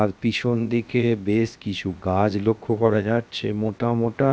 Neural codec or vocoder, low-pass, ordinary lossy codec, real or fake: codec, 16 kHz, 0.7 kbps, FocalCodec; none; none; fake